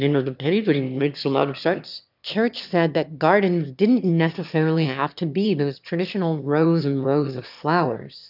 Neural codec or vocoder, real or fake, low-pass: autoencoder, 22.05 kHz, a latent of 192 numbers a frame, VITS, trained on one speaker; fake; 5.4 kHz